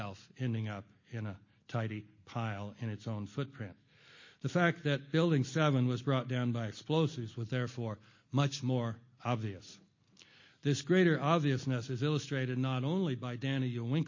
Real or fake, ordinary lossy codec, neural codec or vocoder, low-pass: real; MP3, 32 kbps; none; 7.2 kHz